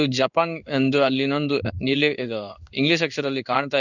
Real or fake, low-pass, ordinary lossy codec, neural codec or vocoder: fake; 7.2 kHz; none; codec, 16 kHz in and 24 kHz out, 1 kbps, XY-Tokenizer